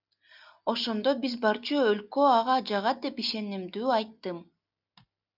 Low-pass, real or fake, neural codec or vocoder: 5.4 kHz; real; none